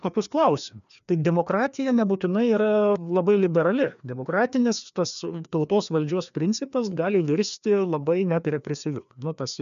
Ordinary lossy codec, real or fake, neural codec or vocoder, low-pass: MP3, 96 kbps; fake; codec, 16 kHz, 2 kbps, FreqCodec, larger model; 7.2 kHz